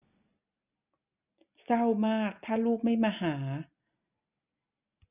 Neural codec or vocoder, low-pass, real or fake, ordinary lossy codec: none; 3.6 kHz; real; none